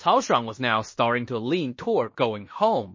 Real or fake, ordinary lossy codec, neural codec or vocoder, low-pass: fake; MP3, 32 kbps; codec, 16 kHz in and 24 kHz out, 0.9 kbps, LongCat-Audio-Codec, fine tuned four codebook decoder; 7.2 kHz